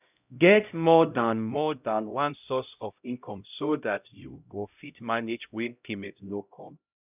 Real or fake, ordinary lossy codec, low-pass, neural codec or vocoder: fake; none; 3.6 kHz; codec, 16 kHz, 0.5 kbps, X-Codec, HuBERT features, trained on LibriSpeech